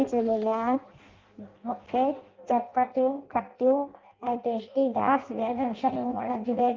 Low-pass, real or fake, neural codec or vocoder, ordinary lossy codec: 7.2 kHz; fake; codec, 16 kHz in and 24 kHz out, 0.6 kbps, FireRedTTS-2 codec; Opus, 24 kbps